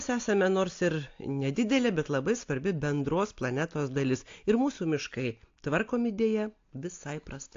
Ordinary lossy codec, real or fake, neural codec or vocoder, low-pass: AAC, 48 kbps; real; none; 7.2 kHz